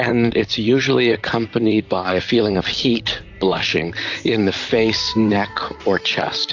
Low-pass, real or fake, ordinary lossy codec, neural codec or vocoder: 7.2 kHz; fake; AAC, 48 kbps; vocoder, 44.1 kHz, 128 mel bands, Pupu-Vocoder